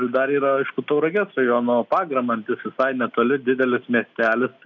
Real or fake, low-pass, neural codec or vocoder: real; 7.2 kHz; none